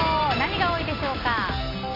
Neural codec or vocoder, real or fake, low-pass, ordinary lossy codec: none; real; 5.4 kHz; AAC, 24 kbps